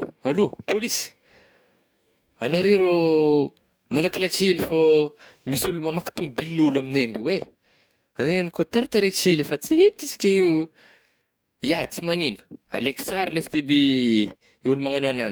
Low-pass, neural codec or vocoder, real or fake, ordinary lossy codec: none; codec, 44.1 kHz, 2.6 kbps, DAC; fake; none